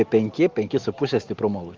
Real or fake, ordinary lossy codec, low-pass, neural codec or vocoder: real; Opus, 32 kbps; 7.2 kHz; none